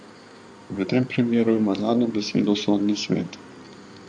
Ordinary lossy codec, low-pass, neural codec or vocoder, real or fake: AAC, 64 kbps; 9.9 kHz; codec, 16 kHz in and 24 kHz out, 2.2 kbps, FireRedTTS-2 codec; fake